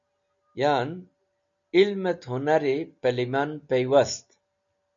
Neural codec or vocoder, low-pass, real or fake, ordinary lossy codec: none; 7.2 kHz; real; MP3, 64 kbps